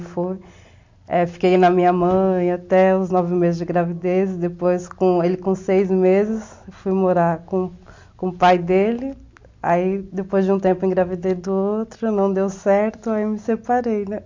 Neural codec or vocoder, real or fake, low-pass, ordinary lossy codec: none; real; 7.2 kHz; MP3, 48 kbps